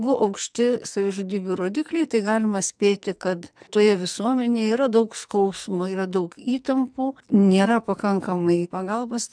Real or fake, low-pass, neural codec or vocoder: fake; 9.9 kHz; codec, 44.1 kHz, 2.6 kbps, SNAC